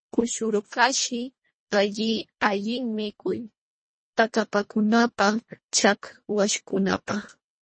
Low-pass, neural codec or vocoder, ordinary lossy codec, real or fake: 9.9 kHz; codec, 24 kHz, 1.5 kbps, HILCodec; MP3, 32 kbps; fake